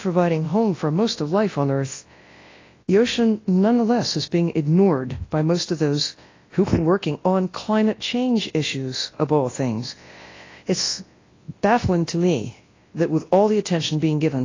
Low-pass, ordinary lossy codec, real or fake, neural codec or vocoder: 7.2 kHz; AAC, 32 kbps; fake; codec, 24 kHz, 0.9 kbps, WavTokenizer, large speech release